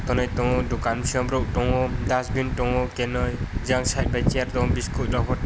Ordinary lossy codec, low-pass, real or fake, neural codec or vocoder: none; none; real; none